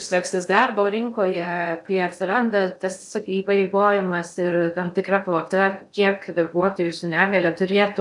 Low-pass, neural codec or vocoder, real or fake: 10.8 kHz; codec, 16 kHz in and 24 kHz out, 0.6 kbps, FocalCodec, streaming, 2048 codes; fake